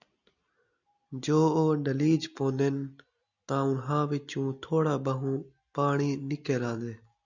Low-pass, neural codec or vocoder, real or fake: 7.2 kHz; none; real